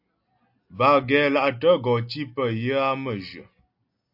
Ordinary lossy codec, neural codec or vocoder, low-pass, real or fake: AAC, 48 kbps; none; 5.4 kHz; real